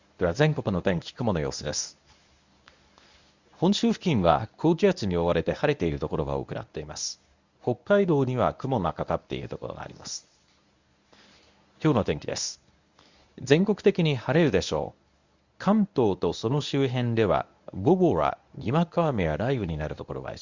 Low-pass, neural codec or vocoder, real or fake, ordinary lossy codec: 7.2 kHz; codec, 24 kHz, 0.9 kbps, WavTokenizer, medium speech release version 1; fake; Opus, 64 kbps